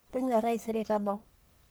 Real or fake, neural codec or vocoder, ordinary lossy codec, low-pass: fake; codec, 44.1 kHz, 1.7 kbps, Pupu-Codec; none; none